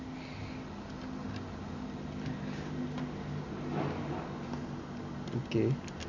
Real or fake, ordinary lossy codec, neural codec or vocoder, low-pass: real; none; none; 7.2 kHz